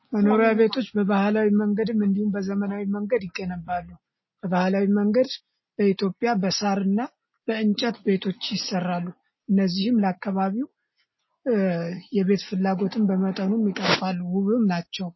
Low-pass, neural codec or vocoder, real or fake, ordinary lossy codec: 7.2 kHz; none; real; MP3, 24 kbps